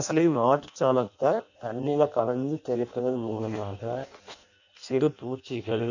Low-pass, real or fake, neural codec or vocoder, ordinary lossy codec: 7.2 kHz; fake; codec, 16 kHz in and 24 kHz out, 0.6 kbps, FireRedTTS-2 codec; none